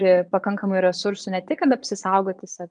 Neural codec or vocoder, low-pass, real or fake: vocoder, 22.05 kHz, 80 mel bands, Vocos; 9.9 kHz; fake